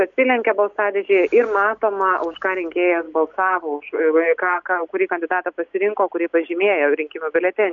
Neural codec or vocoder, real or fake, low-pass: none; real; 7.2 kHz